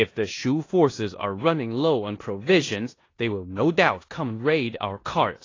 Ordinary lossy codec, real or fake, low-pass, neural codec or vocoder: AAC, 32 kbps; fake; 7.2 kHz; codec, 16 kHz in and 24 kHz out, 0.9 kbps, LongCat-Audio-Codec, four codebook decoder